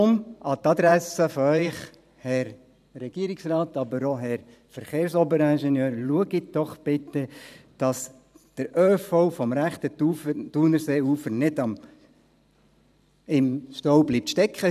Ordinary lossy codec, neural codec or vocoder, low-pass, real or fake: none; vocoder, 44.1 kHz, 128 mel bands every 512 samples, BigVGAN v2; 14.4 kHz; fake